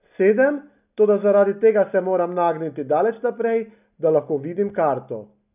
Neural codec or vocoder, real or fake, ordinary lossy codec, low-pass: none; real; none; 3.6 kHz